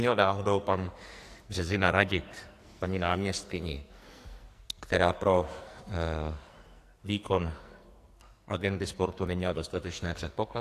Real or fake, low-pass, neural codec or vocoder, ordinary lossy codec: fake; 14.4 kHz; codec, 44.1 kHz, 2.6 kbps, SNAC; AAC, 64 kbps